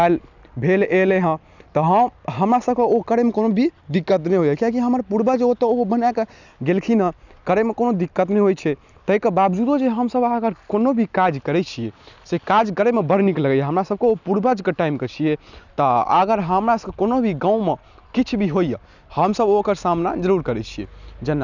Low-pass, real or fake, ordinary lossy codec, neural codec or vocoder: 7.2 kHz; real; none; none